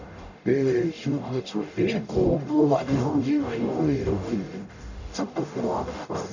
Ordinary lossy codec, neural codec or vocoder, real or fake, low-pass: none; codec, 44.1 kHz, 0.9 kbps, DAC; fake; 7.2 kHz